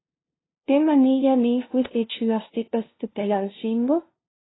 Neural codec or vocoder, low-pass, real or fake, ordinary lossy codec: codec, 16 kHz, 0.5 kbps, FunCodec, trained on LibriTTS, 25 frames a second; 7.2 kHz; fake; AAC, 16 kbps